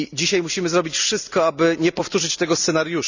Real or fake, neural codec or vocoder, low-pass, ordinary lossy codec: real; none; 7.2 kHz; none